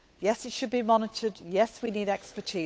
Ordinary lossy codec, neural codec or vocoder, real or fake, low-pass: none; codec, 16 kHz, 8 kbps, FunCodec, trained on Chinese and English, 25 frames a second; fake; none